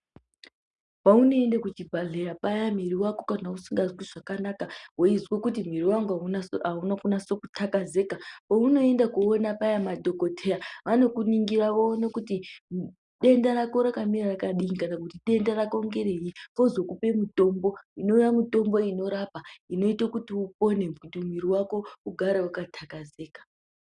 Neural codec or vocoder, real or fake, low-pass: none; real; 10.8 kHz